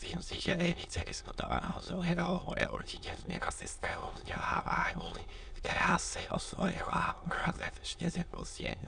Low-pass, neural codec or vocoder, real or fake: 9.9 kHz; autoencoder, 22.05 kHz, a latent of 192 numbers a frame, VITS, trained on many speakers; fake